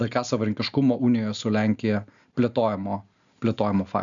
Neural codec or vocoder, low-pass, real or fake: none; 7.2 kHz; real